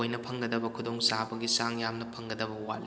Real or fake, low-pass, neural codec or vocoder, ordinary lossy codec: real; none; none; none